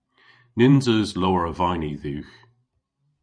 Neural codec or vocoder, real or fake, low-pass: vocoder, 44.1 kHz, 128 mel bands every 512 samples, BigVGAN v2; fake; 9.9 kHz